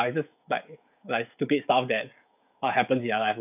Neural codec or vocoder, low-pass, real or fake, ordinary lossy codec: codec, 16 kHz, 16 kbps, FreqCodec, smaller model; 3.6 kHz; fake; none